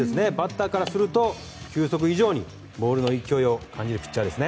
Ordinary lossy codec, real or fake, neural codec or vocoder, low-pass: none; real; none; none